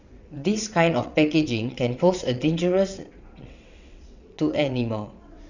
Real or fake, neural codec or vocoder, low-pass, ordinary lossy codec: fake; vocoder, 22.05 kHz, 80 mel bands, WaveNeXt; 7.2 kHz; none